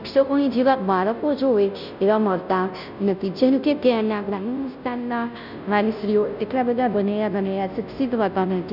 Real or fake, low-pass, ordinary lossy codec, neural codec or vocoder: fake; 5.4 kHz; none; codec, 16 kHz, 0.5 kbps, FunCodec, trained on Chinese and English, 25 frames a second